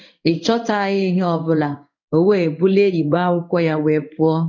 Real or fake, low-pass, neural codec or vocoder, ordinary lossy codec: fake; 7.2 kHz; codec, 16 kHz in and 24 kHz out, 1 kbps, XY-Tokenizer; none